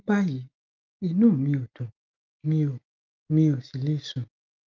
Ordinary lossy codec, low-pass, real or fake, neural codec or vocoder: Opus, 32 kbps; 7.2 kHz; real; none